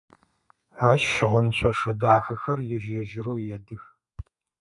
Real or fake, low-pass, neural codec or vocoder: fake; 10.8 kHz; codec, 32 kHz, 1.9 kbps, SNAC